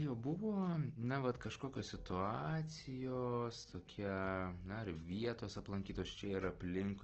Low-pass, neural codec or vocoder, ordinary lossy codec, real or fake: 7.2 kHz; none; Opus, 16 kbps; real